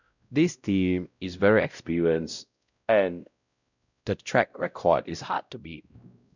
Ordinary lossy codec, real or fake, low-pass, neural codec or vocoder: none; fake; 7.2 kHz; codec, 16 kHz, 0.5 kbps, X-Codec, WavLM features, trained on Multilingual LibriSpeech